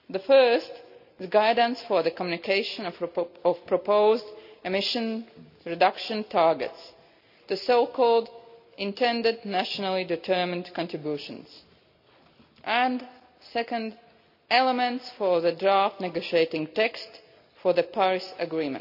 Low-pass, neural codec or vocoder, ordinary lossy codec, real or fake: 5.4 kHz; none; none; real